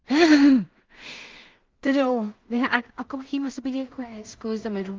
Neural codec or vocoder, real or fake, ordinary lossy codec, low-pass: codec, 16 kHz in and 24 kHz out, 0.4 kbps, LongCat-Audio-Codec, two codebook decoder; fake; Opus, 24 kbps; 7.2 kHz